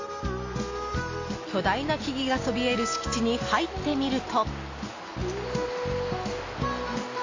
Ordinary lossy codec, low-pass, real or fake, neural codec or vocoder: AAC, 32 kbps; 7.2 kHz; real; none